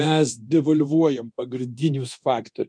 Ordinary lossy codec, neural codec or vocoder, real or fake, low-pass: MP3, 96 kbps; codec, 24 kHz, 0.9 kbps, DualCodec; fake; 9.9 kHz